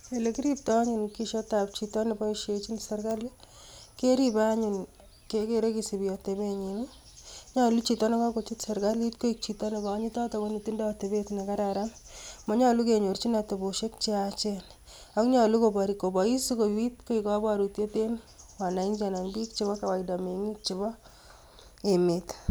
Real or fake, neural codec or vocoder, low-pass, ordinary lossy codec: real; none; none; none